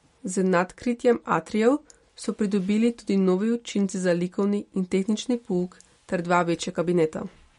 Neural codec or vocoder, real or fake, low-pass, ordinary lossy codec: none; real; 10.8 kHz; MP3, 48 kbps